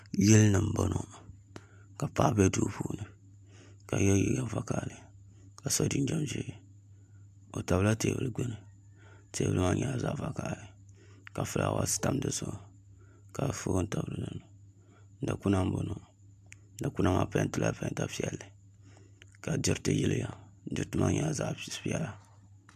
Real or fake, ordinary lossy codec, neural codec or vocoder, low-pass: real; AAC, 96 kbps; none; 14.4 kHz